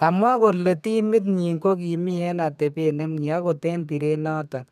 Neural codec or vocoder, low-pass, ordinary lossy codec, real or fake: codec, 32 kHz, 1.9 kbps, SNAC; 14.4 kHz; none; fake